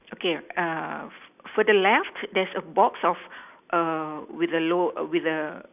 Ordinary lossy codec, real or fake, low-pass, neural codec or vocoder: none; real; 3.6 kHz; none